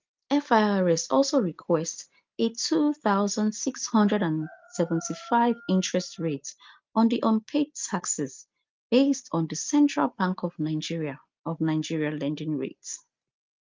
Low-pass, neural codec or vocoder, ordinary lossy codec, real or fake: 7.2 kHz; none; Opus, 24 kbps; real